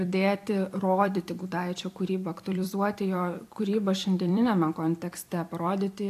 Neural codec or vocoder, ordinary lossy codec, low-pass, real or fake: vocoder, 44.1 kHz, 128 mel bands every 256 samples, BigVGAN v2; AAC, 64 kbps; 14.4 kHz; fake